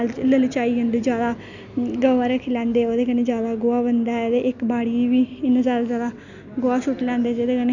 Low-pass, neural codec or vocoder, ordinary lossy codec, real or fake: 7.2 kHz; none; none; real